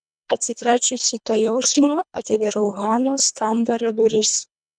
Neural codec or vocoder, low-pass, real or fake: codec, 24 kHz, 1.5 kbps, HILCodec; 9.9 kHz; fake